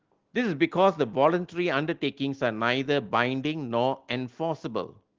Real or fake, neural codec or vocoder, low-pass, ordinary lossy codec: real; none; 7.2 kHz; Opus, 16 kbps